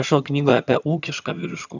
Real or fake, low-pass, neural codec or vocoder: fake; 7.2 kHz; vocoder, 22.05 kHz, 80 mel bands, HiFi-GAN